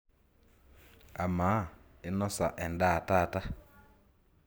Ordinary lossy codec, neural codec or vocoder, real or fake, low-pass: none; none; real; none